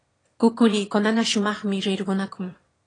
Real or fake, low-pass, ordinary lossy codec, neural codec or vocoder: fake; 9.9 kHz; AAC, 32 kbps; autoencoder, 22.05 kHz, a latent of 192 numbers a frame, VITS, trained on one speaker